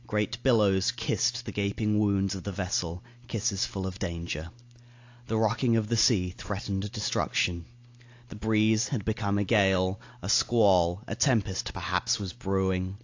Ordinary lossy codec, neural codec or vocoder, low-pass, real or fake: AAC, 48 kbps; none; 7.2 kHz; real